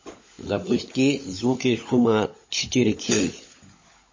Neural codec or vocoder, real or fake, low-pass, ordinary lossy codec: codec, 16 kHz, 16 kbps, FunCodec, trained on LibriTTS, 50 frames a second; fake; 7.2 kHz; MP3, 32 kbps